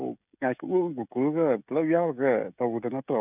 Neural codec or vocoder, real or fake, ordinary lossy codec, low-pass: codec, 16 kHz, 16 kbps, FreqCodec, smaller model; fake; none; 3.6 kHz